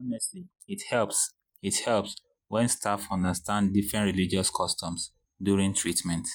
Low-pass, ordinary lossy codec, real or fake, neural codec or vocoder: none; none; real; none